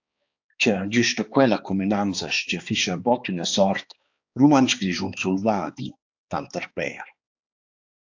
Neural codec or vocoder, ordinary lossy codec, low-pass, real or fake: codec, 16 kHz, 2 kbps, X-Codec, HuBERT features, trained on balanced general audio; AAC, 48 kbps; 7.2 kHz; fake